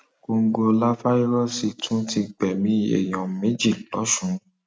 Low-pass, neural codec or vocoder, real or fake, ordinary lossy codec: none; none; real; none